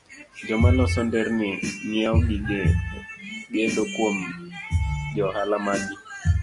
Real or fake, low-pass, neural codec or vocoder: real; 10.8 kHz; none